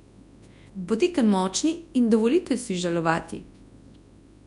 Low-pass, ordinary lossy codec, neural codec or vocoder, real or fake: 10.8 kHz; none; codec, 24 kHz, 0.9 kbps, WavTokenizer, large speech release; fake